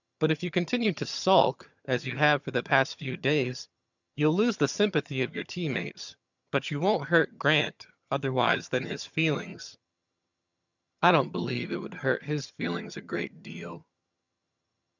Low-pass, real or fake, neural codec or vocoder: 7.2 kHz; fake; vocoder, 22.05 kHz, 80 mel bands, HiFi-GAN